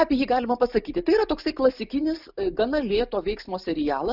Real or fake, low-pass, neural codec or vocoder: real; 5.4 kHz; none